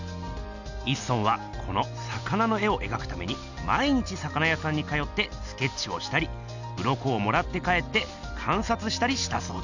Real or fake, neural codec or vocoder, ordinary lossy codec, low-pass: real; none; none; 7.2 kHz